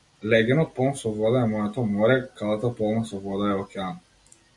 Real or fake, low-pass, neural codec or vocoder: real; 10.8 kHz; none